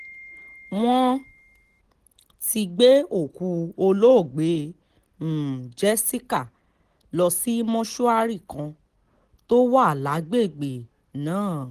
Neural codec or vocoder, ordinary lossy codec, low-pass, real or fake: none; Opus, 16 kbps; 14.4 kHz; real